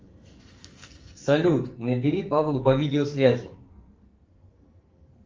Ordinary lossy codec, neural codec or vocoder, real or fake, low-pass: Opus, 32 kbps; codec, 44.1 kHz, 2.6 kbps, SNAC; fake; 7.2 kHz